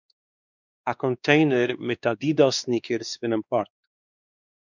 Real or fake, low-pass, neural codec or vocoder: fake; 7.2 kHz; codec, 16 kHz, 2 kbps, X-Codec, WavLM features, trained on Multilingual LibriSpeech